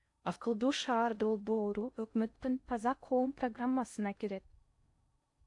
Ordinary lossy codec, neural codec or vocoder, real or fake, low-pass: AAC, 64 kbps; codec, 16 kHz in and 24 kHz out, 0.6 kbps, FocalCodec, streaming, 4096 codes; fake; 10.8 kHz